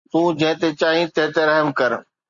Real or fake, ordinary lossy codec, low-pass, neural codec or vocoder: real; Opus, 64 kbps; 7.2 kHz; none